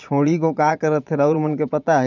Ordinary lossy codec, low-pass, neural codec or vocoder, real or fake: none; 7.2 kHz; vocoder, 22.05 kHz, 80 mel bands, Vocos; fake